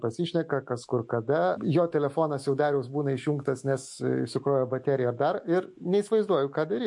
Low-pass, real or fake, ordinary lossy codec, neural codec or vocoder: 10.8 kHz; fake; MP3, 48 kbps; autoencoder, 48 kHz, 128 numbers a frame, DAC-VAE, trained on Japanese speech